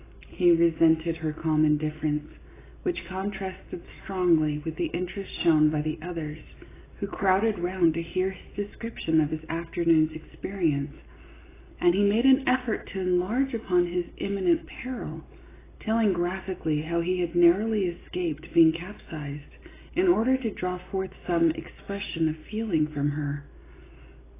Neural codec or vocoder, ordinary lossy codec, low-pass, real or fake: none; AAC, 16 kbps; 3.6 kHz; real